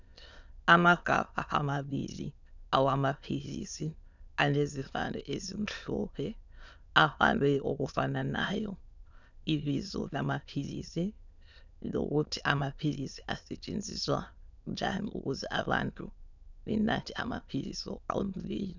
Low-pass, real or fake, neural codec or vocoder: 7.2 kHz; fake; autoencoder, 22.05 kHz, a latent of 192 numbers a frame, VITS, trained on many speakers